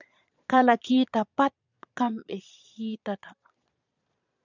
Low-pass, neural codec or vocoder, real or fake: 7.2 kHz; vocoder, 22.05 kHz, 80 mel bands, Vocos; fake